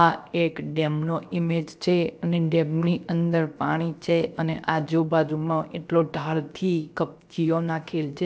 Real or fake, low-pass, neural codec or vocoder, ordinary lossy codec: fake; none; codec, 16 kHz, about 1 kbps, DyCAST, with the encoder's durations; none